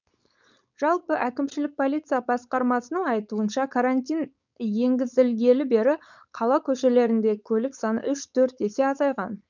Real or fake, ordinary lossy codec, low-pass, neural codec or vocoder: fake; none; 7.2 kHz; codec, 16 kHz, 4.8 kbps, FACodec